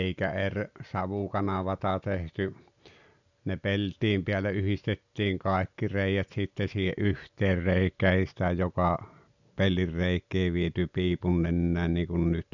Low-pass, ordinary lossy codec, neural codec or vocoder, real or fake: 7.2 kHz; none; none; real